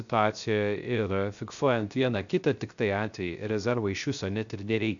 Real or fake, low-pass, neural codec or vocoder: fake; 7.2 kHz; codec, 16 kHz, 0.3 kbps, FocalCodec